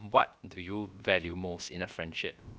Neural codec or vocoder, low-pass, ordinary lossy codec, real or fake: codec, 16 kHz, about 1 kbps, DyCAST, with the encoder's durations; none; none; fake